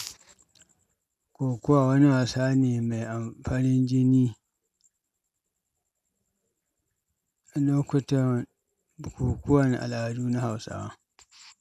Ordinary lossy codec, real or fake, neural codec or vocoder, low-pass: none; real; none; 14.4 kHz